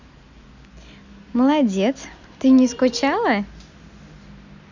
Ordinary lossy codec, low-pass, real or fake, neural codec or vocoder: none; 7.2 kHz; real; none